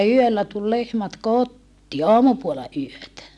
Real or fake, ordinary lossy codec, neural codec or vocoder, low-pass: real; none; none; none